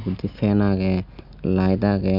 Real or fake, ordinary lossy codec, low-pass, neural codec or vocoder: real; none; 5.4 kHz; none